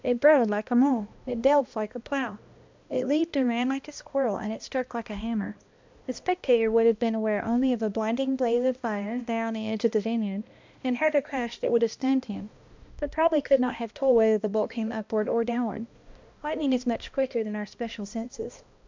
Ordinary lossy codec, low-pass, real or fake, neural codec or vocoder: MP3, 64 kbps; 7.2 kHz; fake; codec, 16 kHz, 1 kbps, X-Codec, HuBERT features, trained on balanced general audio